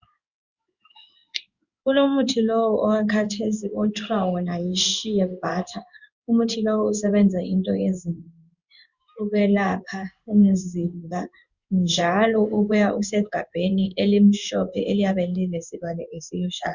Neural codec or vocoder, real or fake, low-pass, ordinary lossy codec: codec, 16 kHz in and 24 kHz out, 1 kbps, XY-Tokenizer; fake; 7.2 kHz; Opus, 64 kbps